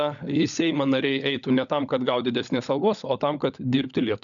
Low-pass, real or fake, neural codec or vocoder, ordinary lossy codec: 7.2 kHz; fake; codec, 16 kHz, 16 kbps, FunCodec, trained on LibriTTS, 50 frames a second; MP3, 96 kbps